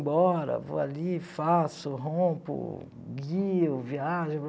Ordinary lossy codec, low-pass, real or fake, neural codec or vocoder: none; none; real; none